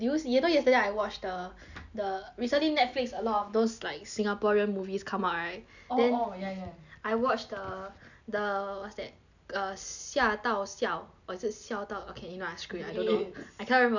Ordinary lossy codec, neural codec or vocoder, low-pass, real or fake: none; none; 7.2 kHz; real